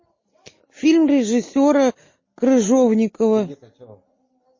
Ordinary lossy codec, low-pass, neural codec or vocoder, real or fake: MP3, 32 kbps; 7.2 kHz; none; real